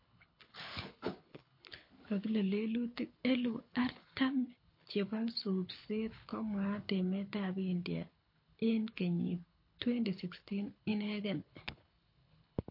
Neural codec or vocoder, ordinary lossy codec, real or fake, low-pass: codec, 24 kHz, 6 kbps, HILCodec; MP3, 32 kbps; fake; 5.4 kHz